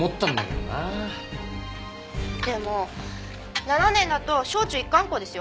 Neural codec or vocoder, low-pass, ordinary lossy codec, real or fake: none; none; none; real